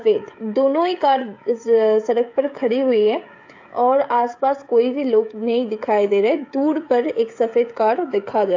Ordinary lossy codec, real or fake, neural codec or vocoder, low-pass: AAC, 48 kbps; fake; codec, 16 kHz, 16 kbps, FreqCodec, smaller model; 7.2 kHz